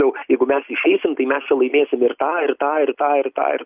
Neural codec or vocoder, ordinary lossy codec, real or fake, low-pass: none; Opus, 24 kbps; real; 3.6 kHz